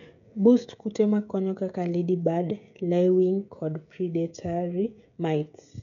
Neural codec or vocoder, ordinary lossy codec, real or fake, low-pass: codec, 16 kHz, 16 kbps, FreqCodec, smaller model; none; fake; 7.2 kHz